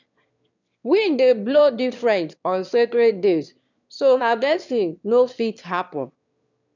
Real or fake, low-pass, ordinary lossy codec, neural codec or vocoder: fake; 7.2 kHz; none; autoencoder, 22.05 kHz, a latent of 192 numbers a frame, VITS, trained on one speaker